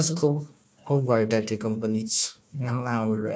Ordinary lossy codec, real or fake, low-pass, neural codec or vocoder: none; fake; none; codec, 16 kHz, 1 kbps, FunCodec, trained on Chinese and English, 50 frames a second